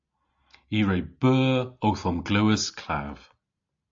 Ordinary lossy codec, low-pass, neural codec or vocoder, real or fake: AAC, 64 kbps; 7.2 kHz; none; real